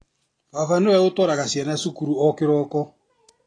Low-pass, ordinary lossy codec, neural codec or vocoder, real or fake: 9.9 kHz; AAC, 32 kbps; none; real